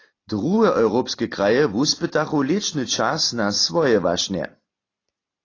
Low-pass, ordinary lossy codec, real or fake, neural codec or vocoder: 7.2 kHz; AAC, 32 kbps; real; none